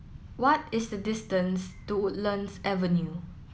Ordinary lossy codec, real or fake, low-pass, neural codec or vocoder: none; real; none; none